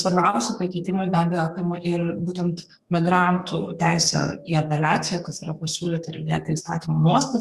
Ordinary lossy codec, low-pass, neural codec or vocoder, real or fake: Opus, 64 kbps; 14.4 kHz; codec, 32 kHz, 1.9 kbps, SNAC; fake